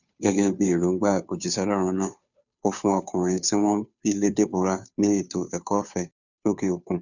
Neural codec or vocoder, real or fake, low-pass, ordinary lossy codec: codec, 16 kHz, 2 kbps, FunCodec, trained on Chinese and English, 25 frames a second; fake; 7.2 kHz; none